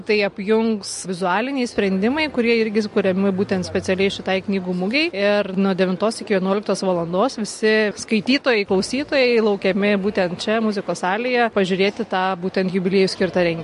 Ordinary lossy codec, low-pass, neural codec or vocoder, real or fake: MP3, 48 kbps; 14.4 kHz; none; real